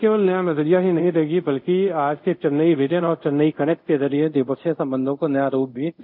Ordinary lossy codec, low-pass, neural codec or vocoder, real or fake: none; 5.4 kHz; codec, 24 kHz, 0.5 kbps, DualCodec; fake